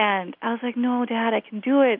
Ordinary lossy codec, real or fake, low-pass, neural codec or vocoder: AAC, 48 kbps; real; 5.4 kHz; none